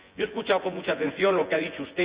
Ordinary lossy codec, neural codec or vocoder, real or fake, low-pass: Opus, 64 kbps; vocoder, 24 kHz, 100 mel bands, Vocos; fake; 3.6 kHz